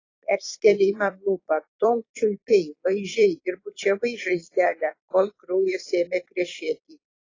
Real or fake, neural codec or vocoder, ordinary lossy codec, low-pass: fake; vocoder, 44.1 kHz, 128 mel bands every 256 samples, BigVGAN v2; AAC, 32 kbps; 7.2 kHz